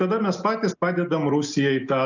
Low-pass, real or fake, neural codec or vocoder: 7.2 kHz; real; none